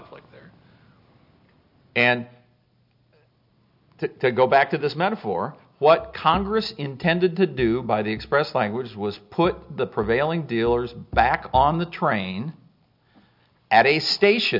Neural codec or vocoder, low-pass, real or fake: none; 5.4 kHz; real